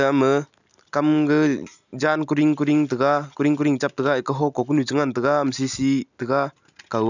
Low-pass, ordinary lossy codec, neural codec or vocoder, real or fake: 7.2 kHz; none; none; real